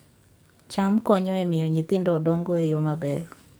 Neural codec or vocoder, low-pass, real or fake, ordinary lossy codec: codec, 44.1 kHz, 2.6 kbps, SNAC; none; fake; none